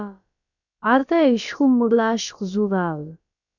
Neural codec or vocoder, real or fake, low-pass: codec, 16 kHz, about 1 kbps, DyCAST, with the encoder's durations; fake; 7.2 kHz